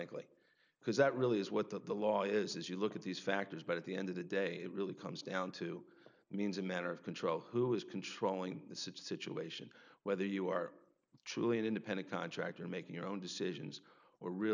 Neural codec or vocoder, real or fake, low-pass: none; real; 7.2 kHz